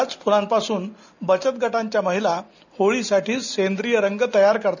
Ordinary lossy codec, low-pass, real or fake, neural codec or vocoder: none; 7.2 kHz; real; none